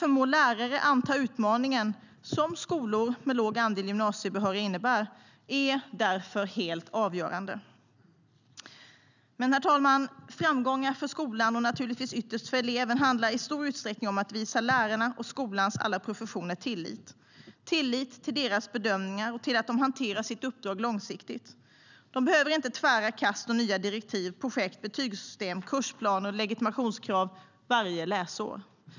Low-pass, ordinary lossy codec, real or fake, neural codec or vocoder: 7.2 kHz; none; real; none